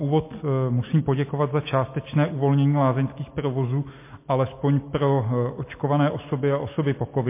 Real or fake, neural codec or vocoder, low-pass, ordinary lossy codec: real; none; 3.6 kHz; MP3, 24 kbps